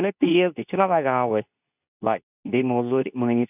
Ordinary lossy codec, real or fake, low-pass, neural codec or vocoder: none; fake; 3.6 kHz; codec, 24 kHz, 0.9 kbps, WavTokenizer, medium speech release version 2